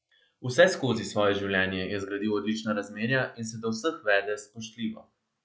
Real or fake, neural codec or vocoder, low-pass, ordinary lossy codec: real; none; none; none